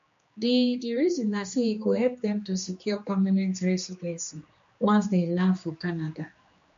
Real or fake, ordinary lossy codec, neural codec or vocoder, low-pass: fake; MP3, 48 kbps; codec, 16 kHz, 2 kbps, X-Codec, HuBERT features, trained on general audio; 7.2 kHz